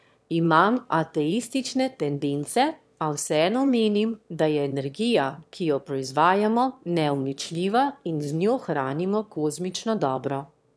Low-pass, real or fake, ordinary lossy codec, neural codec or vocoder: none; fake; none; autoencoder, 22.05 kHz, a latent of 192 numbers a frame, VITS, trained on one speaker